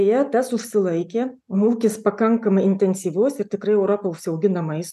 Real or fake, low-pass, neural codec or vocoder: real; 14.4 kHz; none